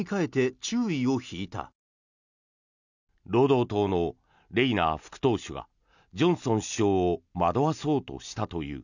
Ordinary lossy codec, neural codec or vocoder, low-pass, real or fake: none; none; 7.2 kHz; real